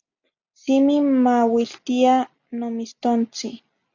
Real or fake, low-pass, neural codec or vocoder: real; 7.2 kHz; none